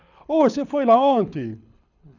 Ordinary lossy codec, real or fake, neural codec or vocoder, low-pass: none; fake; codec, 24 kHz, 6 kbps, HILCodec; 7.2 kHz